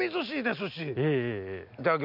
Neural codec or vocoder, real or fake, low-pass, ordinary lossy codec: none; real; 5.4 kHz; none